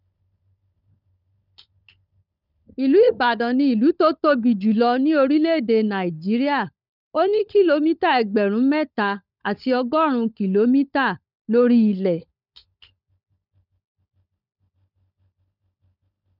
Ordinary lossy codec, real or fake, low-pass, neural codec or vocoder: none; fake; 5.4 kHz; codec, 16 kHz, 16 kbps, FunCodec, trained on LibriTTS, 50 frames a second